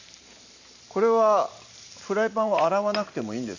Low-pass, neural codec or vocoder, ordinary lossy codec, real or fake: 7.2 kHz; vocoder, 44.1 kHz, 128 mel bands every 512 samples, BigVGAN v2; AAC, 48 kbps; fake